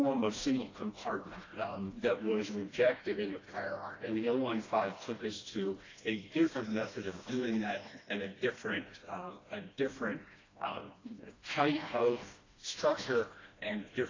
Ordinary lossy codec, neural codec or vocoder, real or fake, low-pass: AAC, 32 kbps; codec, 16 kHz, 1 kbps, FreqCodec, smaller model; fake; 7.2 kHz